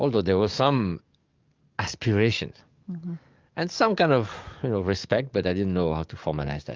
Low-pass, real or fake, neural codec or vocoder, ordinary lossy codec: 7.2 kHz; fake; codec, 44.1 kHz, 7.8 kbps, DAC; Opus, 24 kbps